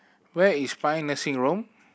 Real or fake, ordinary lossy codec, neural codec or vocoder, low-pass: real; none; none; none